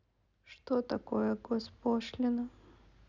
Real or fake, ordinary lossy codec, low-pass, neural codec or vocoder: real; none; 7.2 kHz; none